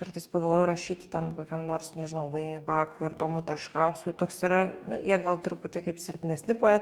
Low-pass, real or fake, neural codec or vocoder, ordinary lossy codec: 19.8 kHz; fake; codec, 44.1 kHz, 2.6 kbps, DAC; Opus, 64 kbps